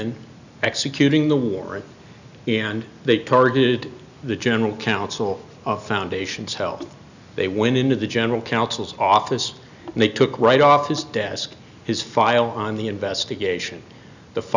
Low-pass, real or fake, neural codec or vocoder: 7.2 kHz; real; none